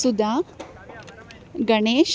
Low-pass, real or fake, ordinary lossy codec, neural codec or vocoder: none; real; none; none